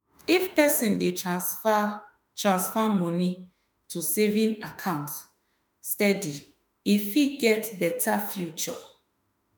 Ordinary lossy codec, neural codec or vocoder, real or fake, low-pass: none; autoencoder, 48 kHz, 32 numbers a frame, DAC-VAE, trained on Japanese speech; fake; none